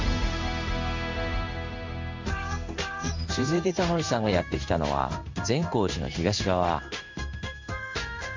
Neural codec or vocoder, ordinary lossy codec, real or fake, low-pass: codec, 16 kHz in and 24 kHz out, 1 kbps, XY-Tokenizer; MP3, 64 kbps; fake; 7.2 kHz